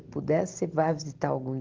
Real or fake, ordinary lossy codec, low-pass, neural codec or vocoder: real; Opus, 16 kbps; 7.2 kHz; none